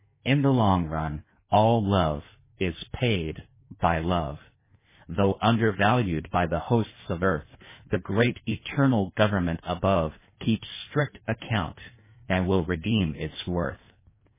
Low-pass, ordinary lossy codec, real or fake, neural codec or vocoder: 3.6 kHz; MP3, 16 kbps; fake; codec, 16 kHz in and 24 kHz out, 1.1 kbps, FireRedTTS-2 codec